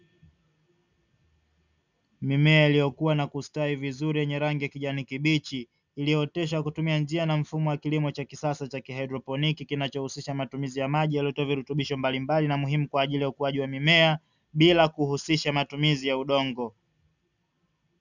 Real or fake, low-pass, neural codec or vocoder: real; 7.2 kHz; none